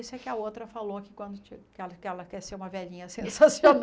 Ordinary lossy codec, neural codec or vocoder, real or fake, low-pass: none; none; real; none